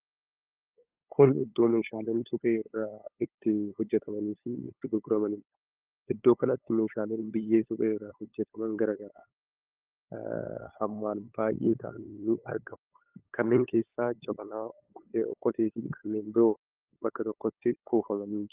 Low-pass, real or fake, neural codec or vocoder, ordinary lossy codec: 3.6 kHz; fake; codec, 16 kHz, 8 kbps, FunCodec, trained on LibriTTS, 25 frames a second; Opus, 32 kbps